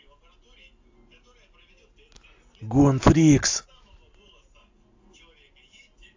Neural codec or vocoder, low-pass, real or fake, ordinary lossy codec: none; 7.2 kHz; real; AAC, 48 kbps